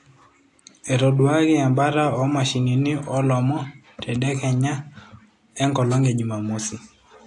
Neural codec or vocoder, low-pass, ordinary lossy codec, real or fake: none; 10.8 kHz; AAC, 48 kbps; real